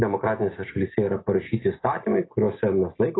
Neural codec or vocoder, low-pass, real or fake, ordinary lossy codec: none; 7.2 kHz; real; AAC, 16 kbps